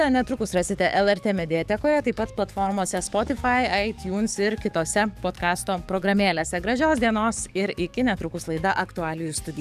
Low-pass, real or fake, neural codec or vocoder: 14.4 kHz; fake; codec, 44.1 kHz, 7.8 kbps, DAC